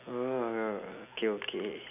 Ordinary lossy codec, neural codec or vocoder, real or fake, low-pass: none; none; real; 3.6 kHz